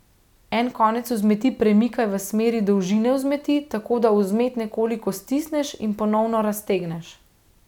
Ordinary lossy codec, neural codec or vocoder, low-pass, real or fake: none; none; 19.8 kHz; real